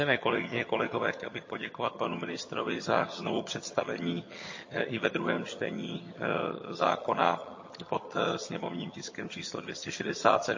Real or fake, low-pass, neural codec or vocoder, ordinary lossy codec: fake; 7.2 kHz; vocoder, 22.05 kHz, 80 mel bands, HiFi-GAN; MP3, 32 kbps